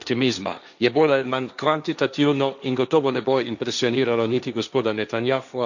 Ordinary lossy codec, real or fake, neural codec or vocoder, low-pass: none; fake; codec, 16 kHz, 1.1 kbps, Voila-Tokenizer; 7.2 kHz